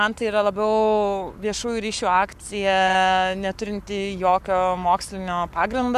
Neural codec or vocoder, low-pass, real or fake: codec, 44.1 kHz, 7.8 kbps, Pupu-Codec; 14.4 kHz; fake